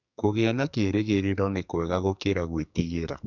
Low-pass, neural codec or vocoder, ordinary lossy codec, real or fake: 7.2 kHz; codec, 44.1 kHz, 2.6 kbps, SNAC; none; fake